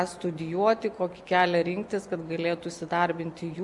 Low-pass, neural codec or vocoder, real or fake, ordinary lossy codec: 10.8 kHz; none; real; Opus, 64 kbps